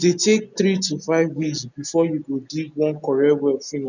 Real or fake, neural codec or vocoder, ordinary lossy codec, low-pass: real; none; none; 7.2 kHz